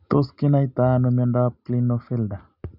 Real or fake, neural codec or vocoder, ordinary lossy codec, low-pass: real; none; none; 5.4 kHz